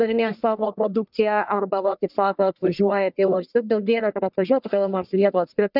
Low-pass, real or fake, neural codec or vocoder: 5.4 kHz; fake; codec, 44.1 kHz, 1.7 kbps, Pupu-Codec